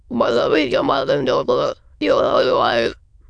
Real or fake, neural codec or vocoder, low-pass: fake; autoencoder, 22.05 kHz, a latent of 192 numbers a frame, VITS, trained on many speakers; 9.9 kHz